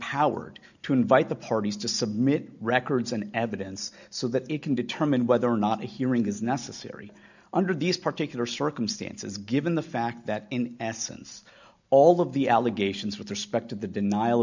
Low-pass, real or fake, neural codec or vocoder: 7.2 kHz; real; none